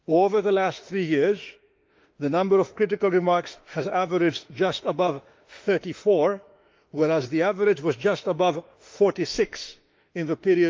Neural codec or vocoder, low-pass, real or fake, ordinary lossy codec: autoencoder, 48 kHz, 32 numbers a frame, DAC-VAE, trained on Japanese speech; 7.2 kHz; fake; Opus, 32 kbps